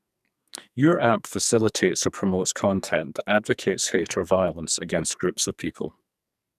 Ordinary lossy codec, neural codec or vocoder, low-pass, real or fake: none; codec, 44.1 kHz, 2.6 kbps, SNAC; 14.4 kHz; fake